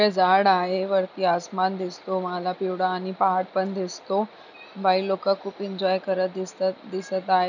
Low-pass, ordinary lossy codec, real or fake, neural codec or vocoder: 7.2 kHz; none; real; none